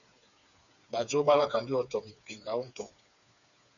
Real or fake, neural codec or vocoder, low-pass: fake; codec, 16 kHz, 4 kbps, FreqCodec, smaller model; 7.2 kHz